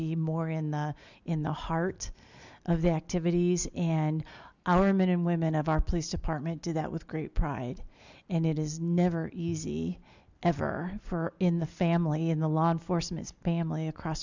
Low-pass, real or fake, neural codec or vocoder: 7.2 kHz; real; none